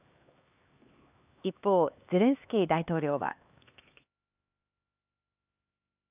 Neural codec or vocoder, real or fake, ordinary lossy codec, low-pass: codec, 16 kHz, 2 kbps, X-Codec, HuBERT features, trained on LibriSpeech; fake; AAC, 32 kbps; 3.6 kHz